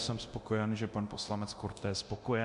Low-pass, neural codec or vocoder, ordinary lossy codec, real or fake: 10.8 kHz; codec, 24 kHz, 0.9 kbps, DualCodec; MP3, 64 kbps; fake